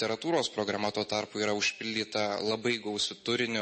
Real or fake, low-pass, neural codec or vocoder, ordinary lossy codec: real; 10.8 kHz; none; MP3, 32 kbps